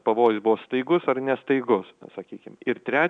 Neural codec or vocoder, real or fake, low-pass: none; real; 9.9 kHz